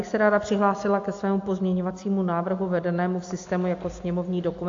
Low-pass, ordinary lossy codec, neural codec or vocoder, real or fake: 7.2 kHz; AAC, 48 kbps; none; real